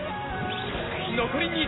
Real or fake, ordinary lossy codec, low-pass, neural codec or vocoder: real; AAC, 16 kbps; 7.2 kHz; none